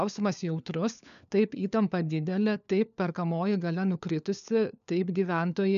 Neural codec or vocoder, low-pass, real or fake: codec, 16 kHz, 4 kbps, FunCodec, trained on LibriTTS, 50 frames a second; 7.2 kHz; fake